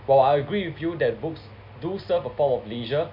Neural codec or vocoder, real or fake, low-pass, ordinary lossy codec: none; real; 5.4 kHz; none